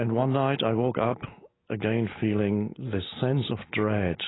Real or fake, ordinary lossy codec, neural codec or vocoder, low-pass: real; AAC, 16 kbps; none; 7.2 kHz